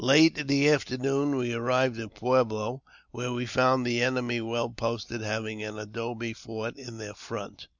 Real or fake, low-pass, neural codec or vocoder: real; 7.2 kHz; none